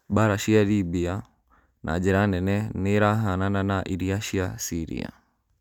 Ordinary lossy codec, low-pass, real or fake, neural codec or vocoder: none; 19.8 kHz; real; none